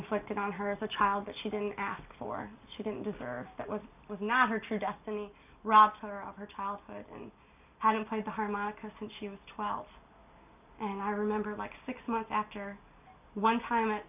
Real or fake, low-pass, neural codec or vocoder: real; 3.6 kHz; none